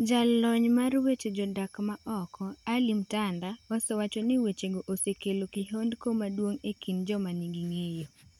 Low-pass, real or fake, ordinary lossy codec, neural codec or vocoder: 19.8 kHz; real; none; none